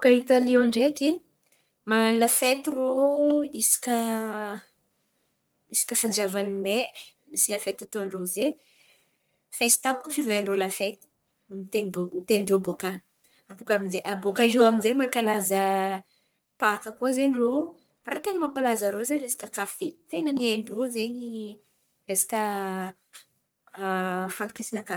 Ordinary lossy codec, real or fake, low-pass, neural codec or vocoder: none; fake; none; codec, 44.1 kHz, 1.7 kbps, Pupu-Codec